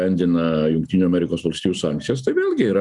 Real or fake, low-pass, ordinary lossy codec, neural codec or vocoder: real; 10.8 kHz; MP3, 96 kbps; none